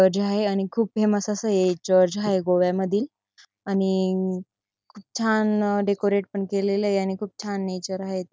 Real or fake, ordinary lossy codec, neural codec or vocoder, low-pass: real; none; none; none